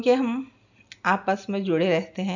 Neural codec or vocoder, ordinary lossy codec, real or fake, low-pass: none; none; real; 7.2 kHz